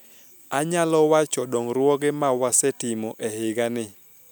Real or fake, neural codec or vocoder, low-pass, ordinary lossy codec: real; none; none; none